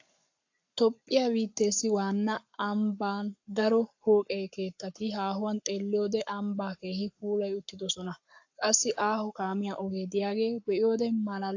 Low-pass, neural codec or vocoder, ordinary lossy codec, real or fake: 7.2 kHz; codec, 44.1 kHz, 7.8 kbps, Pupu-Codec; AAC, 48 kbps; fake